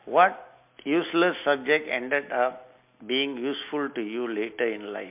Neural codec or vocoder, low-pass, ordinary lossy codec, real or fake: none; 3.6 kHz; MP3, 32 kbps; real